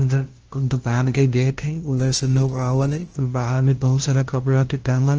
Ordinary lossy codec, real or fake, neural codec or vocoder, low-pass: Opus, 16 kbps; fake; codec, 16 kHz, 0.5 kbps, FunCodec, trained on LibriTTS, 25 frames a second; 7.2 kHz